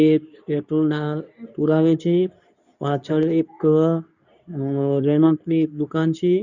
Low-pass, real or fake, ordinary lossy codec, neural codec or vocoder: 7.2 kHz; fake; none; codec, 24 kHz, 0.9 kbps, WavTokenizer, medium speech release version 2